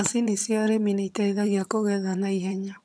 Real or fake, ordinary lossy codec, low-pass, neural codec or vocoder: fake; none; none; vocoder, 22.05 kHz, 80 mel bands, HiFi-GAN